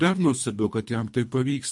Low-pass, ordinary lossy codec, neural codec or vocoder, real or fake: 10.8 kHz; MP3, 48 kbps; codec, 24 kHz, 3 kbps, HILCodec; fake